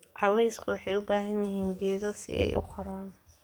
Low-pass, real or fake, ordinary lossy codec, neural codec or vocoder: none; fake; none; codec, 44.1 kHz, 2.6 kbps, SNAC